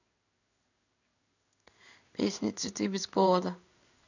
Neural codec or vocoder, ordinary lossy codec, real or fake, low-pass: codec, 16 kHz in and 24 kHz out, 1 kbps, XY-Tokenizer; none; fake; 7.2 kHz